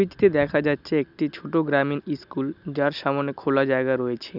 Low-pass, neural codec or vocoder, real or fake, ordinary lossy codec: 5.4 kHz; none; real; none